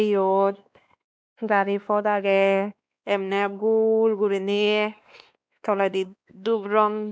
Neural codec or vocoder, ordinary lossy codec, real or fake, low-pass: codec, 16 kHz, 2 kbps, X-Codec, WavLM features, trained on Multilingual LibriSpeech; none; fake; none